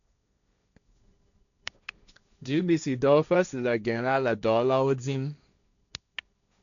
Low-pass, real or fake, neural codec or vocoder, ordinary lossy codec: 7.2 kHz; fake; codec, 16 kHz, 1.1 kbps, Voila-Tokenizer; none